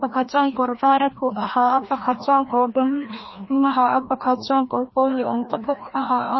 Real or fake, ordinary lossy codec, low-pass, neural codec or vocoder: fake; MP3, 24 kbps; 7.2 kHz; codec, 16 kHz, 1 kbps, FreqCodec, larger model